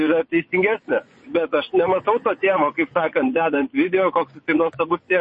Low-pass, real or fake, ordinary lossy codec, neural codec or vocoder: 10.8 kHz; fake; MP3, 32 kbps; vocoder, 24 kHz, 100 mel bands, Vocos